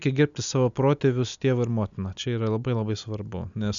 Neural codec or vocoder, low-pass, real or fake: none; 7.2 kHz; real